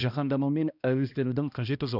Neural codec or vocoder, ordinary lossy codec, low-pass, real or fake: codec, 16 kHz, 1 kbps, X-Codec, HuBERT features, trained on balanced general audio; none; 5.4 kHz; fake